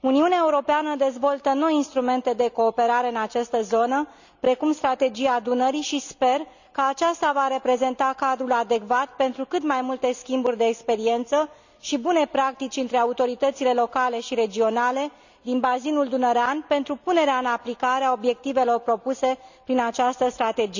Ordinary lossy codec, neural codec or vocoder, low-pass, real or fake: none; none; 7.2 kHz; real